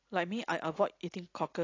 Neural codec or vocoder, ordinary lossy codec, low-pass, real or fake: none; AAC, 32 kbps; 7.2 kHz; real